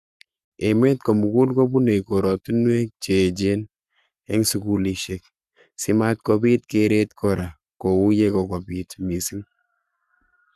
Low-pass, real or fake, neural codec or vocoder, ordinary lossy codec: 14.4 kHz; fake; codec, 44.1 kHz, 7.8 kbps, Pupu-Codec; Opus, 64 kbps